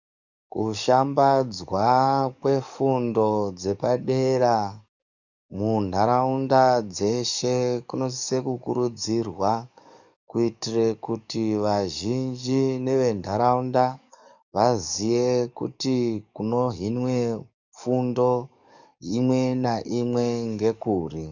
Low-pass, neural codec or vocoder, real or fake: 7.2 kHz; codec, 44.1 kHz, 7.8 kbps, DAC; fake